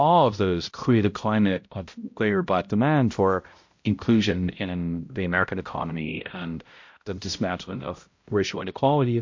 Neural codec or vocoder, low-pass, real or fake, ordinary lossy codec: codec, 16 kHz, 0.5 kbps, X-Codec, HuBERT features, trained on balanced general audio; 7.2 kHz; fake; MP3, 48 kbps